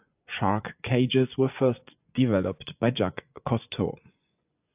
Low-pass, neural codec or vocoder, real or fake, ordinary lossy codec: 3.6 kHz; vocoder, 24 kHz, 100 mel bands, Vocos; fake; AAC, 32 kbps